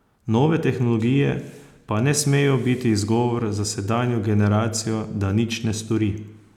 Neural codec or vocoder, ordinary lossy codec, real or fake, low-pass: none; none; real; 19.8 kHz